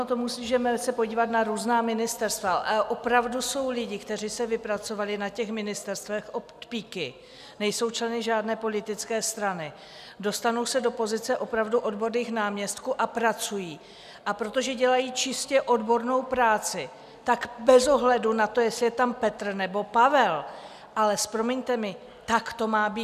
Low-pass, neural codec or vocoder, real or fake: 14.4 kHz; none; real